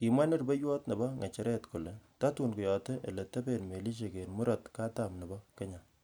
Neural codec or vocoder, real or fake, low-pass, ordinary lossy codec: none; real; none; none